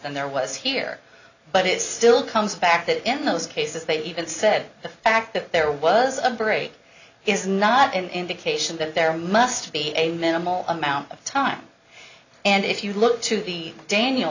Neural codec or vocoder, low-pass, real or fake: none; 7.2 kHz; real